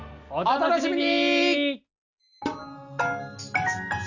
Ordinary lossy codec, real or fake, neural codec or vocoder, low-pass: none; real; none; 7.2 kHz